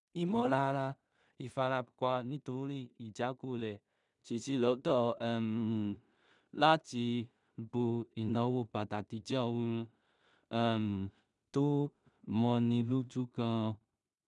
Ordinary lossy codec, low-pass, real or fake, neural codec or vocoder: none; 10.8 kHz; fake; codec, 16 kHz in and 24 kHz out, 0.4 kbps, LongCat-Audio-Codec, two codebook decoder